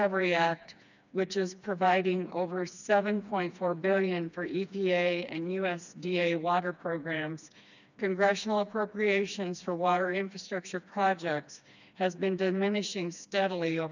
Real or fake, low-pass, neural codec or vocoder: fake; 7.2 kHz; codec, 16 kHz, 2 kbps, FreqCodec, smaller model